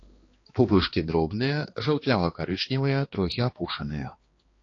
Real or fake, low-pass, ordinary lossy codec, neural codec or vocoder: fake; 7.2 kHz; AAC, 32 kbps; codec, 16 kHz, 2 kbps, X-Codec, HuBERT features, trained on balanced general audio